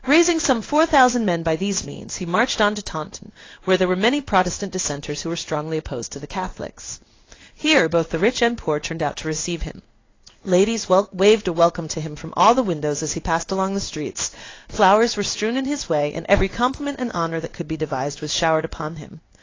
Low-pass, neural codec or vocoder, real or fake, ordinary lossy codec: 7.2 kHz; codec, 16 kHz in and 24 kHz out, 1 kbps, XY-Tokenizer; fake; AAC, 32 kbps